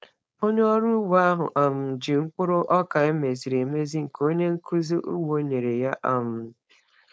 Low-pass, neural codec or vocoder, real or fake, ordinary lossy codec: none; codec, 16 kHz, 4.8 kbps, FACodec; fake; none